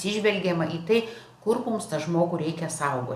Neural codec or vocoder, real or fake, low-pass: none; real; 14.4 kHz